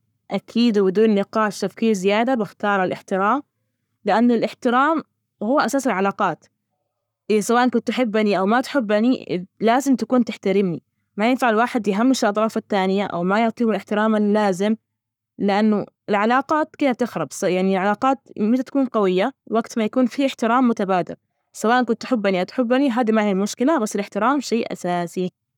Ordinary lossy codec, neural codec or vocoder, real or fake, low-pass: none; codec, 44.1 kHz, 7.8 kbps, Pupu-Codec; fake; 19.8 kHz